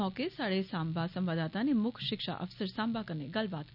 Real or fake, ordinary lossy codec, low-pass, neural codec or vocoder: real; none; 5.4 kHz; none